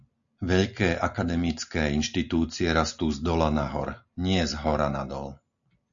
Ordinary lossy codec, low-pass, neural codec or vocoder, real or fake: MP3, 96 kbps; 7.2 kHz; none; real